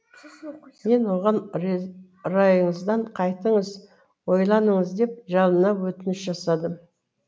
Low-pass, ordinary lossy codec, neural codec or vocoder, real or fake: none; none; none; real